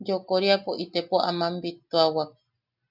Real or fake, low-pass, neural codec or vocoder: real; 5.4 kHz; none